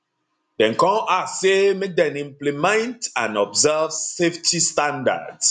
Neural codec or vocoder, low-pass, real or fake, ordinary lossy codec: none; 10.8 kHz; real; none